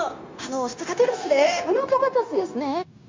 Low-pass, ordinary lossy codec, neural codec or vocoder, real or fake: 7.2 kHz; MP3, 48 kbps; codec, 16 kHz, 0.9 kbps, LongCat-Audio-Codec; fake